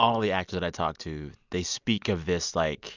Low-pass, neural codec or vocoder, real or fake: 7.2 kHz; none; real